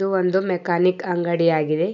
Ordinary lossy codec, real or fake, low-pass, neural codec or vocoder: none; real; 7.2 kHz; none